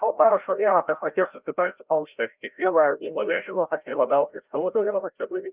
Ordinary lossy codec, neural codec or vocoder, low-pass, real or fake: Opus, 32 kbps; codec, 16 kHz, 0.5 kbps, FreqCodec, larger model; 3.6 kHz; fake